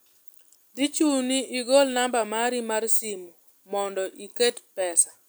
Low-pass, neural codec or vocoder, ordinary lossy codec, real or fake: none; none; none; real